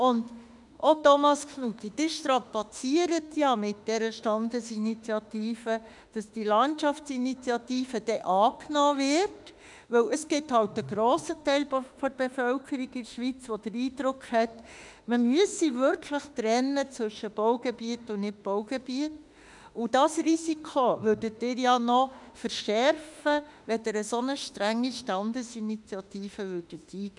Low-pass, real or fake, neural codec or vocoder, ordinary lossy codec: 10.8 kHz; fake; autoencoder, 48 kHz, 32 numbers a frame, DAC-VAE, trained on Japanese speech; none